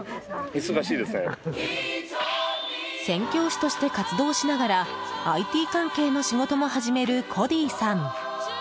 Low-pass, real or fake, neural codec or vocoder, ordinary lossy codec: none; real; none; none